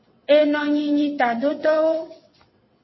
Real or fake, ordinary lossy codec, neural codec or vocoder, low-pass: fake; MP3, 24 kbps; vocoder, 22.05 kHz, 80 mel bands, WaveNeXt; 7.2 kHz